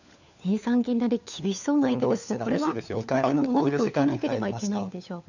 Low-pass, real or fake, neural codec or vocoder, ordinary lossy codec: 7.2 kHz; fake; codec, 16 kHz, 4 kbps, FunCodec, trained on LibriTTS, 50 frames a second; none